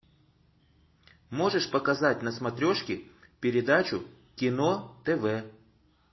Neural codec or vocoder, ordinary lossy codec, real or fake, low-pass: none; MP3, 24 kbps; real; 7.2 kHz